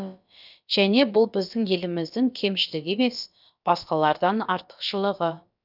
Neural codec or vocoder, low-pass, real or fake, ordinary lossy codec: codec, 16 kHz, about 1 kbps, DyCAST, with the encoder's durations; 5.4 kHz; fake; none